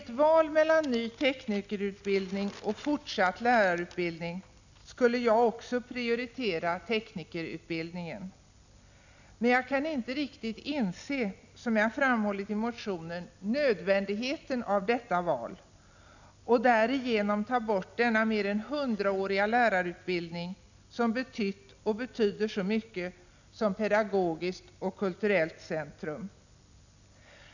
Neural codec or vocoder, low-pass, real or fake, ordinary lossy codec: none; 7.2 kHz; real; none